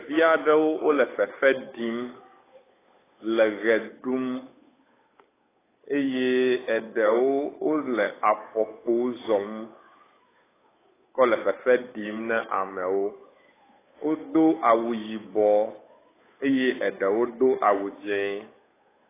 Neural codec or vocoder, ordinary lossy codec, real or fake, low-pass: codec, 16 kHz, 8 kbps, FunCodec, trained on Chinese and English, 25 frames a second; AAC, 16 kbps; fake; 3.6 kHz